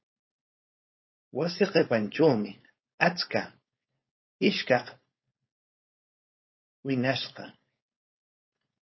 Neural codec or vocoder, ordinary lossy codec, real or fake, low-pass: codec, 16 kHz, 4.8 kbps, FACodec; MP3, 24 kbps; fake; 7.2 kHz